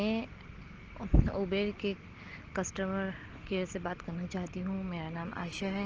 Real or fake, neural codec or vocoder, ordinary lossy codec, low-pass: real; none; Opus, 16 kbps; 7.2 kHz